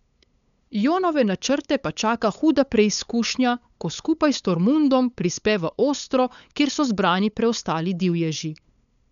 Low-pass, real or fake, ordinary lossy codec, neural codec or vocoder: 7.2 kHz; fake; none; codec, 16 kHz, 8 kbps, FunCodec, trained on LibriTTS, 25 frames a second